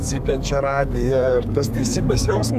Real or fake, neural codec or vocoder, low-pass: fake; codec, 32 kHz, 1.9 kbps, SNAC; 14.4 kHz